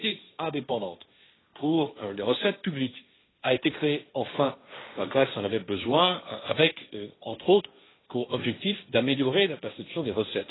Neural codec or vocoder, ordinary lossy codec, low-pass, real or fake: codec, 16 kHz, 1.1 kbps, Voila-Tokenizer; AAC, 16 kbps; 7.2 kHz; fake